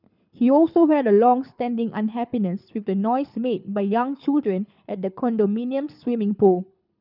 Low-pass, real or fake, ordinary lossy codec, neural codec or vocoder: 5.4 kHz; fake; none; codec, 24 kHz, 6 kbps, HILCodec